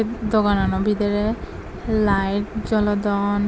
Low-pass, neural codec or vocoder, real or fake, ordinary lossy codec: none; none; real; none